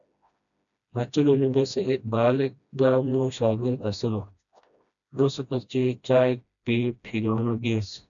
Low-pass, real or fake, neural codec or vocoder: 7.2 kHz; fake; codec, 16 kHz, 1 kbps, FreqCodec, smaller model